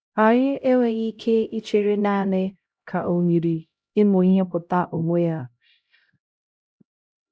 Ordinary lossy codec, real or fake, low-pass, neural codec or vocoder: none; fake; none; codec, 16 kHz, 0.5 kbps, X-Codec, HuBERT features, trained on LibriSpeech